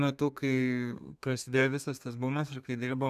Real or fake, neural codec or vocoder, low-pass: fake; codec, 32 kHz, 1.9 kbps, SNAC; 14.4 kHz